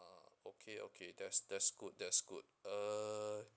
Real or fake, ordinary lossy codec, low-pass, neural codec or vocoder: real; none; none; none